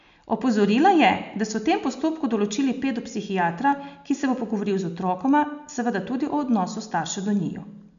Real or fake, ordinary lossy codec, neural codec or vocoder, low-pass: real; none; none; 7.2 kHz